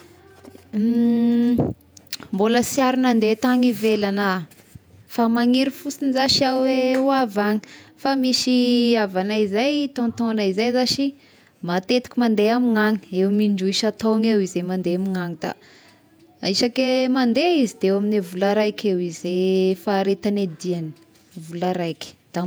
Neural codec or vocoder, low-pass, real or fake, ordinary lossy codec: vocoder, 48 kHz, 128 mel bands, Vocos; none; fake; none